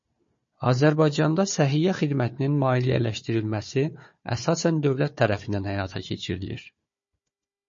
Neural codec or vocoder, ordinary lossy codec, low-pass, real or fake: codec, 16 kHz, 4 kbps, FunCodec, trained on Chinese and English, 50 frames a second; MP3, 32 kbps; 7.2 kHz; fake